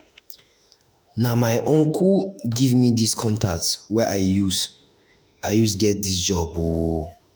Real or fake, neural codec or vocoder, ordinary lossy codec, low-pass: fake; autoencoder, 48 kHz, 32 numbers a frame, DAC-VAE, trained on Japanese speech; none; none